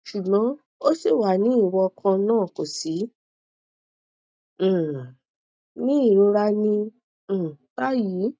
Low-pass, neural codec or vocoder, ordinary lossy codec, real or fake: none; none; none; real